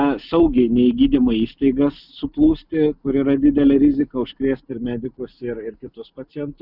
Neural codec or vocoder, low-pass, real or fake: none; 5.4 kHz; real